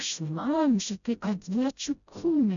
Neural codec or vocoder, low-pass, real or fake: codec, 16 kHz, 0.5 kbps, FreqCodec, smaller model; 7.2 kHz; fake